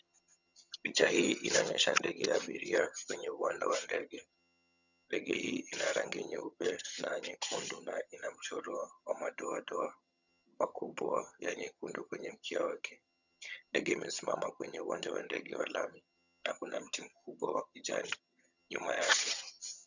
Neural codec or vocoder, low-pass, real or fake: vocoder, 22.05 kHz, 80 mel bands, HiFi-GAN; 7.2 kHz; fake